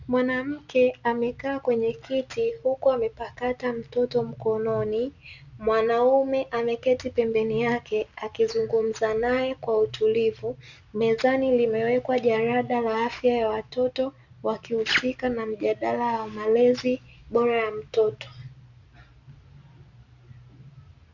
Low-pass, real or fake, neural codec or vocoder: 7.2 kHz; real; none